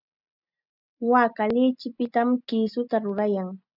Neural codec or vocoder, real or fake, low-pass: none; real; 5.4 kHz